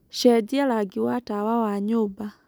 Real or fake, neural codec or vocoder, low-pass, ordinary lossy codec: real; none; none; none